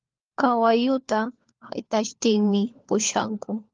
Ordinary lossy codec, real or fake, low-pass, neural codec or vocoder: Opus, 32 kbps; fake; 7.2 kHz; codec, 16 kHz, 4 kbps, FunCodec, trained on LibriTTS, 50 frames a second